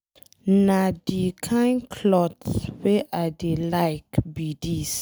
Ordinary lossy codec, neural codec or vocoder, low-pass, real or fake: none; none; none; real